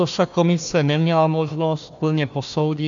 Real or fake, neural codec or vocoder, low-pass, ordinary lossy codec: fake; codec, 16 kHz, 1 kbps, FunCodec, trained on Chinese and English, 50 frames a second; 7.2 kHz; AAC, 64 kbps